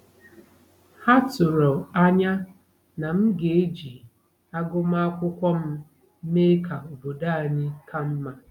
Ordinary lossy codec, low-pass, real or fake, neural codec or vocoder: none; 19.8 kHz; real; none